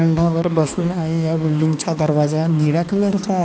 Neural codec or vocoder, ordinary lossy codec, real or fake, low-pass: codec, 16 kHz, 2 kbps, X-Codec, HuBERT features, trained on balanced general audio; none; fake; none